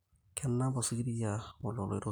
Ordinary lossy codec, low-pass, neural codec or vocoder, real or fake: none; none; none; real